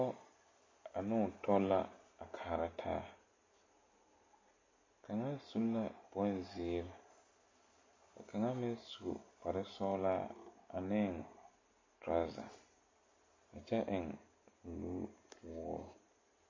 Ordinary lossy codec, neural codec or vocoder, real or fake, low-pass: MP3, 32 kbps; none; real; 7.2 kHz